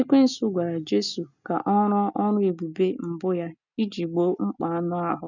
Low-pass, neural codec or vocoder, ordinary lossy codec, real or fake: 7.2 kHz; none; none; real